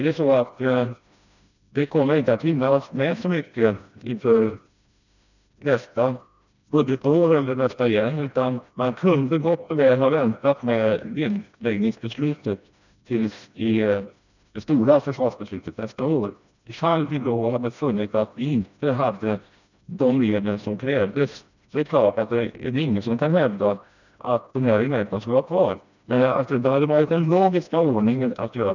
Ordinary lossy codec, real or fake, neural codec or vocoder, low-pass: none; fake; codec, 16 kHz, 1 kbps, FreqCodec, smaller model; 7.2 kHz